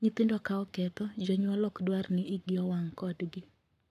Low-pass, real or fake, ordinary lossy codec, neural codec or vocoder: 14.4 kHz; fake; none; codec, 44.1 kHz, 7.8 kbps, Pupu-Codec